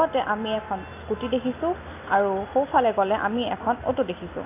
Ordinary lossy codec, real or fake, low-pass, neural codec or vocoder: AAC, 24 kbps; real; 3.6 kHz; none